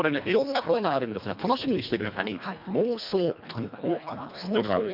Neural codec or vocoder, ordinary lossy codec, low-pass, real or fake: codec, 24 kHz, 1.5 kbps, HILCodec; none; 5.4 kHz; fake